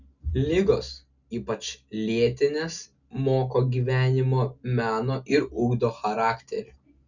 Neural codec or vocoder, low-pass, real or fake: none; 7.2 kHz; real